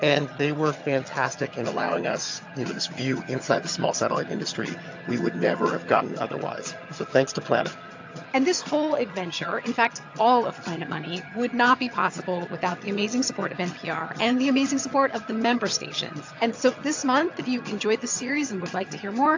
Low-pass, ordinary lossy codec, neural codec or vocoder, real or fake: 7.2 kHz; AAC, 48 kbps; vocoder, 22.05 kHz, 80 mel bands, HiFi-GAN; fake